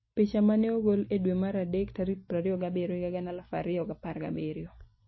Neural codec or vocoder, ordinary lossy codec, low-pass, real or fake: none; MP3, 24 kbps; 7.2 kHz; real